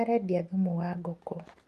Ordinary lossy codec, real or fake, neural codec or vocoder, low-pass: Opus, 32 kbps; real; none; 14.4 kHz